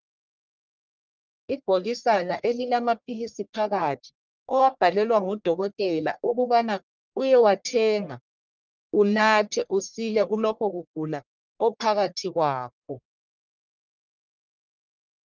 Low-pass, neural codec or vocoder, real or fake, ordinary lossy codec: 7.2 kHz; codec, 44.1 kHz, 1.7 kbps, Pupu-Codec; fake; Opus, 32 kbps